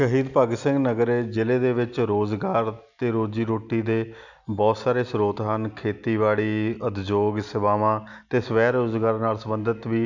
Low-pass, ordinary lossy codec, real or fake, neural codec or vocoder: 7.2 kHz; none; real; none